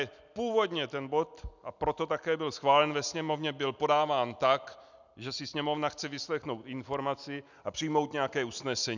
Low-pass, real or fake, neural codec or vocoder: 7.2 kHz; real; none